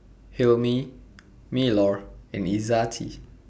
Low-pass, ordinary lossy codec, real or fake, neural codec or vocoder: none; none; real; none